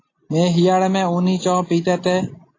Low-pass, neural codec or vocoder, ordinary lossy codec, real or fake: 7.2 kHz; none; AAC, 32 kbps; real